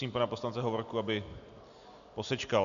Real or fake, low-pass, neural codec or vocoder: real; 7.2 kHz; none